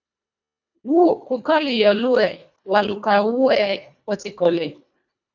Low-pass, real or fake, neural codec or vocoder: 7.2 kHz; fake; codec, 24 kHz, 1.5 kbps, HILCodec